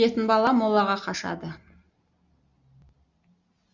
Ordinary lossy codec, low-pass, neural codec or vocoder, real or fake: Opus, 64 kbps; 7.2 kHz; none; real